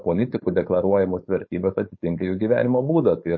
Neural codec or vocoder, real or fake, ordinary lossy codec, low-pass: codec, 16 kHz, 4.8 kbps, FACodec; fake; MP3, 32 kbps; 7.2 kHz